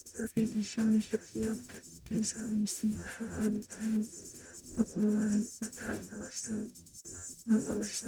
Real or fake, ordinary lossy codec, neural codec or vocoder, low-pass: fake; none; codec, 44.1 kHz, 0.9 kbps, DAC; none